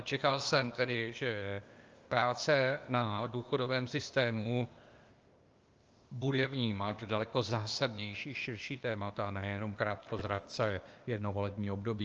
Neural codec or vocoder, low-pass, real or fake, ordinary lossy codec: codec, 16 kHz, 0.8 kbps, ZipCodec; 7.2 kHz; fake; Opus, 24 kbps